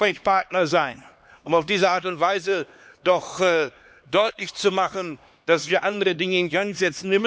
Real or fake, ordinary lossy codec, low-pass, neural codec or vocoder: fake; none; none; codec, 16 kHz, 2 kbps, X-Codec, HuBERT features, trained on LibriSpeech